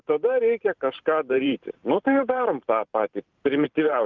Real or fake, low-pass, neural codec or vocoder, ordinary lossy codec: fake; 7.2 kHz; vocoder, 44.1 kHz, 128 mel bands every 512 samples, BigVGAN v2; Opus, 32 kbps